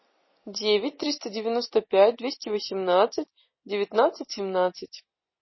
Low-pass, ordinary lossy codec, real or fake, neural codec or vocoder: 7.2 kHz; MP3, 24 kbps; real; none